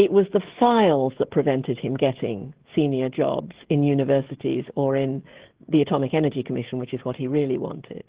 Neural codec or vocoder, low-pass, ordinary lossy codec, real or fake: codec, 16 kHz, 16 kbps, FreqCodec, smaller model; 3.6 kHz; Opus, 16 kbps; fake